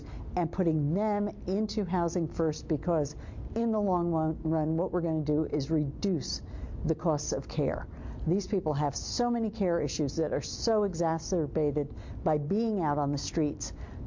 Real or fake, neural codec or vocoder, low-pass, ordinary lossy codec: real; none; 7.2 kHz; MP3, 48 kbps